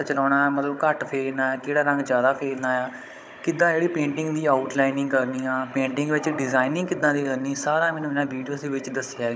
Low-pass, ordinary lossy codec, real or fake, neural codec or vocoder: none; none; fake; codec, 16 kHz, 16 kbps, FunCodec, trained on Chinese and English, 50 frames a second